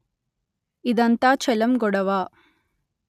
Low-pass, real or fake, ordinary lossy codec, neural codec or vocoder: 14.4 kHz; real; none; none